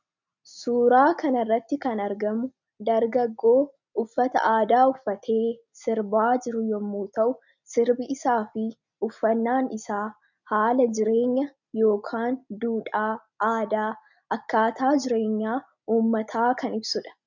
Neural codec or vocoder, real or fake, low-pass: none; real; 7.2 kHz